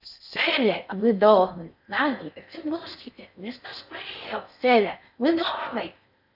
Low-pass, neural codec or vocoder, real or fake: 5.4 kHz; codec, 16 kHz in and 24 kHz out, 0.6 kbps, FocalCodec, streaming, 4096 codes; fake